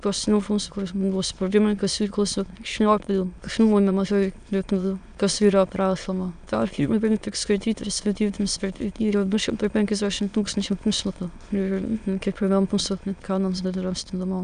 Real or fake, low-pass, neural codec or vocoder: fake; 9.9 kHz; autoencoder, 22.05 kHz, a latent of 192 numbers a frame, VITS, trained on many speakers